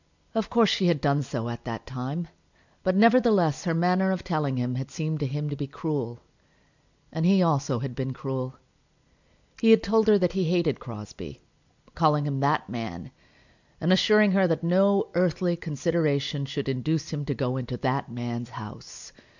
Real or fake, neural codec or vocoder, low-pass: real; none; 7.2 kHz